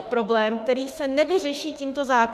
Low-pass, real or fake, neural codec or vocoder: 14.4 kHz; fake; autoencoder, 48 kHz, 32 numbers a frame, DAC-VAE, trained on Japanese speech